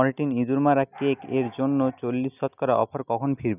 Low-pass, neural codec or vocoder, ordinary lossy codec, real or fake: 3.6 kHz; none; none; real